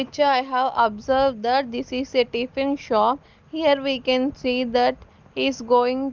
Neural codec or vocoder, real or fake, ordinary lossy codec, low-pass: none; real; Opus, 24 kbps; 7.2 kHz